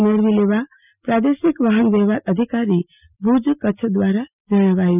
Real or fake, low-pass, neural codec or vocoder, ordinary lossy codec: real; 3.6 kHz; none; none